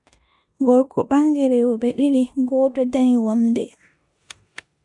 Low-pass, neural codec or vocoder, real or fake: 10.8 kHz; codec, 16 kHz in and 24 kHz out, 0.9 kbps, LongCat-Audio-Codec, four codebook decoder; fake